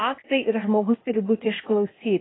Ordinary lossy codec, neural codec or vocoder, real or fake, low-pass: AAC, 16 kbps; codec, 16 kHz, 0.8 kbps, ZipCodec; fake; 7.2 kHz